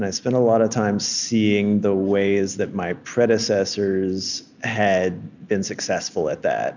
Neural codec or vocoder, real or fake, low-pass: none; real; 7.2 kHz